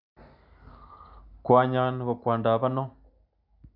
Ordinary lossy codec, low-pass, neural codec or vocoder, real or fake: none; 5.4 kHz; none; real